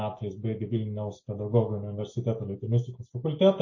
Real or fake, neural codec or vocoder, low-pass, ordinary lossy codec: real; none; 7.2 kHz; MP3, 32 kbps